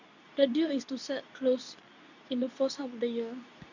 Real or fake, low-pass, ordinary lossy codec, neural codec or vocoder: fake; 7.2 kHz; none; codec, 24 kHz, 0.9 kbps, WavTokenizer, medium speech release version 2